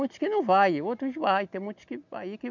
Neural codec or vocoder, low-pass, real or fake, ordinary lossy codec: none; 7.2 kHz; real; none